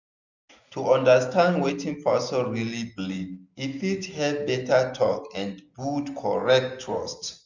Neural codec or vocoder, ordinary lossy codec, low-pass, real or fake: none; none; 7.2 kHz; real